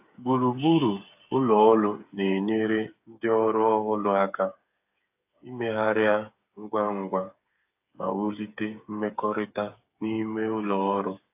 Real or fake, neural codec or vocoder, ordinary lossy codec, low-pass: fake; codec, 16 kHz, 8 kbps, FreqCodec, smaller model; AAC, 32 kbps; 3.6 kHz